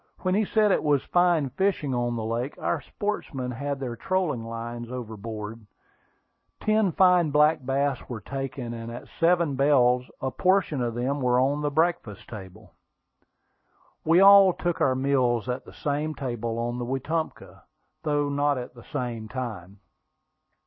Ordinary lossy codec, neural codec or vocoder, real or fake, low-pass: MP3, 24 kbps; none; real; 7.2 kHz